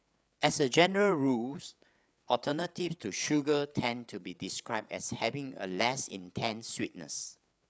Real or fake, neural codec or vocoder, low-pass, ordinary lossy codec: fake; codec, 16 kHz, 8 kbps, FreqCodec, larger model; none; none